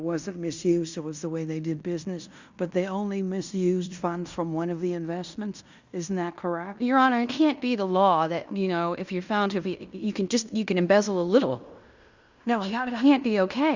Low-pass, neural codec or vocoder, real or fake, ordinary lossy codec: 7.2 kHz; codec, 16 kHz in and 24 kHz out, 0.9 kbps, LongCat-Audio-Codec, fine tuned four codebook decoder; fake; Opus, 64 kbps